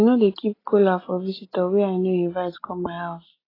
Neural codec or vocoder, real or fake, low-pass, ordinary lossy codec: none; real; 5.4 kHz; AAC, 24 kbps